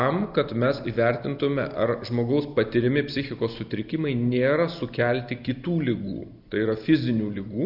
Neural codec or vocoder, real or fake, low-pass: none; real; 5.4 kHz